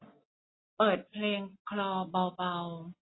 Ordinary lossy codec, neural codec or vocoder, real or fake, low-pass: AAC, 16 kbps; none; real; 7.2 kHz